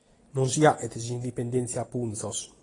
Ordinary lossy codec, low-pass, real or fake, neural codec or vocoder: AAC, 32 kbps; 10.8 kHz; real; none